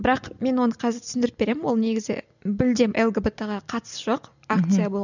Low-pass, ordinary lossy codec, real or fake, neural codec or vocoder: 7.2 kHz; none; real; none